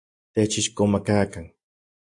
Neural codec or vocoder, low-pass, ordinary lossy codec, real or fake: none; 10.8 kHz; AAC, 64 kbps; real